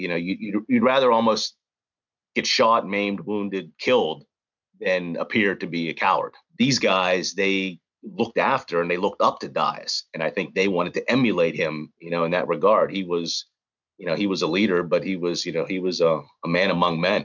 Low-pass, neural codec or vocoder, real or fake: 7.2 kHz; none; real